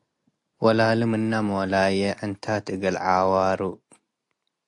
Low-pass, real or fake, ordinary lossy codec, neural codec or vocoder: 10.8 kHz; real; AAC, 64 kbps; none